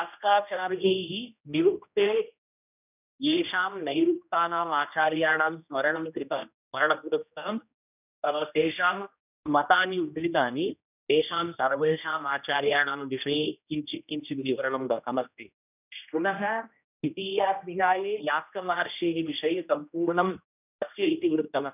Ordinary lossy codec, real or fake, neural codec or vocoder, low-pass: none; fake; codec, 16 kHz, 1 kbps, X-Codec, HuBERT features, trained on general audio; 3.6 kHz